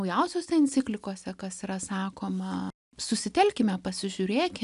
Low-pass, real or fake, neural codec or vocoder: 10.8 kHz; real; none